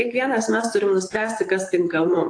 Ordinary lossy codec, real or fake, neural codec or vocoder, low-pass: AAC, 48 kbps; fake; vocoder, 44.1 kHz, 128 mel bands, Pupu-Vocoder; 9.9 kHz